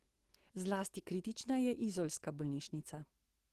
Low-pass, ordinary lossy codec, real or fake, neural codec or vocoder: 14.4 kHz; Opus, 16 kbps; fake; autoencoder, 48 kHz, 128 numbers a frame, DAC-VAE, trained on Japanese speech